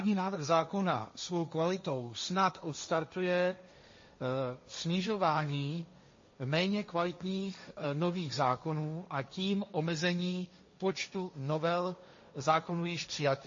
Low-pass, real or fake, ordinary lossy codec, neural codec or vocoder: 7.2 kHz; fake; MP3, 32 kbps; codec, 16 kHz, 1.1 kbps, Voila-Tokenizer